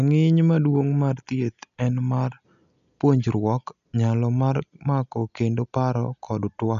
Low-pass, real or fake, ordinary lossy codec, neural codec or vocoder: 7.2 kHz; real; none; none